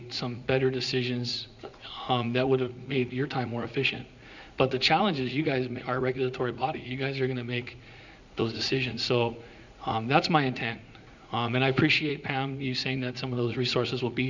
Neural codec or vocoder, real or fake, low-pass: vocoder, 44.1 kHz, 80 mel bands, Vocos; fake; 7.2 kHz